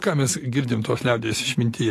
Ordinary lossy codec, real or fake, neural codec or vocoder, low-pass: AAC, 48 kbps; real; none; 14.4 kHz